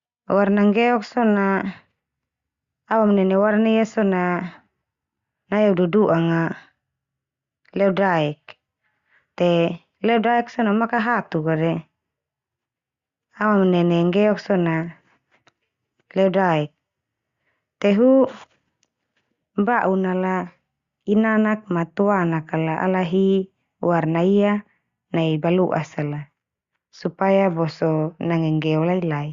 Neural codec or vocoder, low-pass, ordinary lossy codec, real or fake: none; 7.2 kHz; Opus, 64 kbps; real